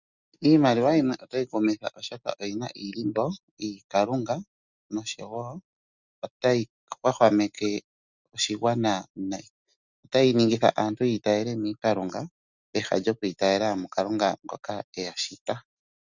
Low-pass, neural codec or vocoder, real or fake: 7.2 kHz; none; real